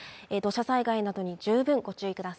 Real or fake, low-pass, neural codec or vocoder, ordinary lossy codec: real; none; none; none